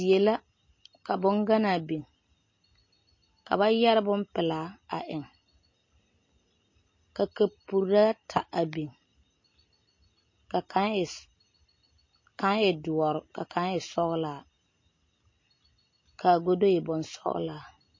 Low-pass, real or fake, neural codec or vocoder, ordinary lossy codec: 7.2 kHz; real; none; MP3, 32 kbps